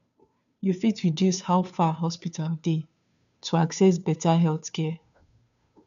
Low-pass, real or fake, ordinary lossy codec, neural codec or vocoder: 7.2 kHz; fake; none; codec, 16 kHz, 2 kbps, FunCodec, trained on Chinese and English, 25 frames a second